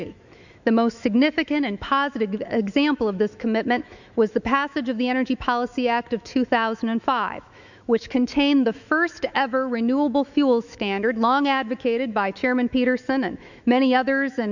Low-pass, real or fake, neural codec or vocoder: 7.2 kHz; fake; autoencoder, 48 kHz, 128 numbers a frame, DAC-VAE, trained on Japanese speech